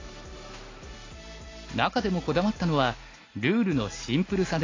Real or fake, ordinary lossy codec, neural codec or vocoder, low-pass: real; AAC, 32 kbps; none; 7.2 kHz